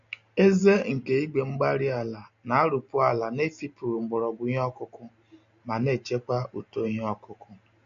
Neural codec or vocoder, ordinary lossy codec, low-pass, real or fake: none; MP3, 48 kbps; 7.2 kHz; real